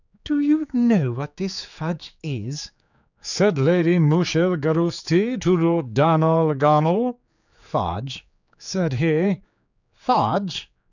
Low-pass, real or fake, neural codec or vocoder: 7.2 kHz; fake; codec, 16 kHz, 4 kbps, X-Codec, HuBERT features, trained on general audio